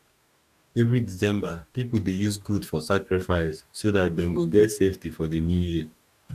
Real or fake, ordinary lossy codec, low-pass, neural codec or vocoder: fake; none; 14.4 kHz; codec, 44.1 kHz, 2.6 kbps, DAC